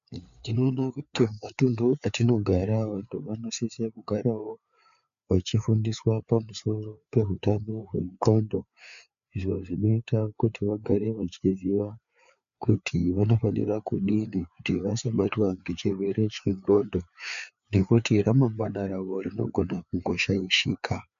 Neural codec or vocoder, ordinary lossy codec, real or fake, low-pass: codec, 16 kHz, 4 kbps, FreqCodec, larger model; MP3, 96 kbps; fake; 7.2 kHz